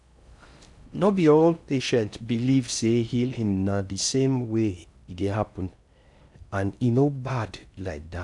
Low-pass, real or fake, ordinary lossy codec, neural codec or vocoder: 10.8 kHz; fake; none; codec, 16 kHz in and 24 kHz out, 0.6 kbps, FocalCodec, streaming, 4096 codes